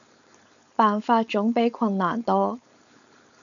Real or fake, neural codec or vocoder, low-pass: fake; codec, 16 kHz, 4.8 kbps, FACodec; 7.2 kHz